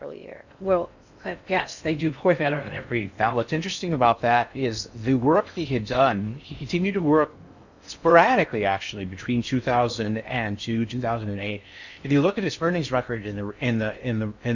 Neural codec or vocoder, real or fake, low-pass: codec, 16 kHz in and 24 kHz out, 0.6 kbps, FocalCodec, streaming, 2048 codes; fake; 7.2 kHz